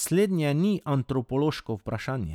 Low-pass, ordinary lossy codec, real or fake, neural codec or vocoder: 19.8 kHz; none; real; none